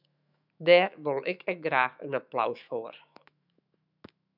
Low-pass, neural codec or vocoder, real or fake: 5.4 kHz; autoencoder, 48 kHz, 128 numbers a frame, DAC-VAE, trained on Japanese speech; fake